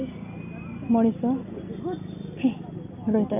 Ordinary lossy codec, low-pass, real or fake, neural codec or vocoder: none; 3.6 kHz; real; none